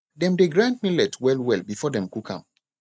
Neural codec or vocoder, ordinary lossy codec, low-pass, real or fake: none; none; none; real